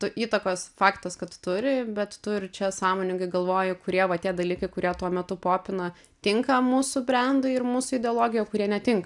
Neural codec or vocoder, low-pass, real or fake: none; 10.8 kHz; real